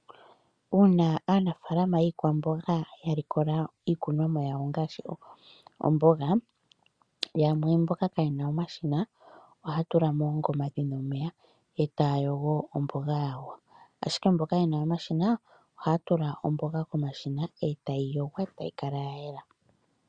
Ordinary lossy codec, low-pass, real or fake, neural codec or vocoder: Opus, 64 kbps; 9.9 kHz; real; none